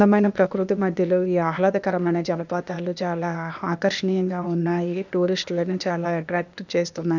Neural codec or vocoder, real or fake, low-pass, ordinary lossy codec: codec, 16 kHz, 0.8 kbps, ZipCodec; fake; 7.2 kHz; none